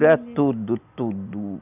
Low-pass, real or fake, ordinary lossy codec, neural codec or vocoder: 3.6 kHz; real; none; none